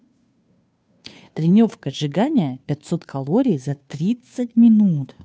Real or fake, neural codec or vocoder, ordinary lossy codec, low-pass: fake; codec, 16 kHz, 2 kbps, FunCodec, trained on Chinese and English, 25 frames a second; none; none